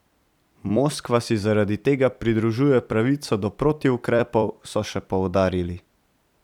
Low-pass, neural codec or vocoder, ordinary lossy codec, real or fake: 19.8 kHz; vocoder, 44.1 kHz, 128 mel bands every 256 samples, BigVGAN v2; none; fake